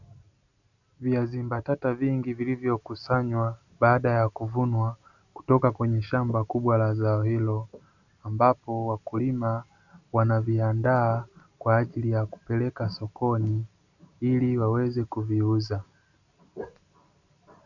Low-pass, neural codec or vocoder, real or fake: 7.2 kHz; none; real